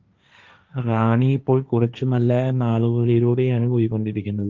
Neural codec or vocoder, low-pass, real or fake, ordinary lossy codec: codec, 16 kHz, 1.1 kbps, Voila-Tokenizer; 7.2 kHz; fake; Opus, 24 kbps